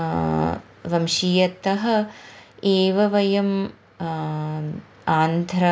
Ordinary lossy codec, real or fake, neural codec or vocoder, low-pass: none; real; none; none